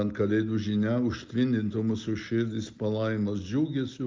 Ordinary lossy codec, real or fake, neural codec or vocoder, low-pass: Opus, 32 kbps; real; none; 7.2 kHz